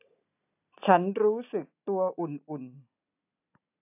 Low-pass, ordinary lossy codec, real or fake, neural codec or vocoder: 3.6 kHz; AAC, 32 kbps; real; none